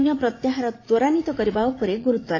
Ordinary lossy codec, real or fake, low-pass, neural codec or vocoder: AAC, 32 kbps; fake; 7.2 kHz; vocoder, 44.1 kHz, 80 mel bands, Vocos